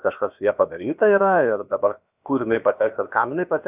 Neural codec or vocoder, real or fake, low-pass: codec, 16 kHz, about 1 kbps, DyCAST, with the encoder's durations; fake; 3.6 kHz